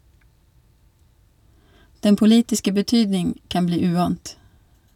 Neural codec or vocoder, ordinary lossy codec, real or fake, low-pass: none; none; real; 19.8 kHz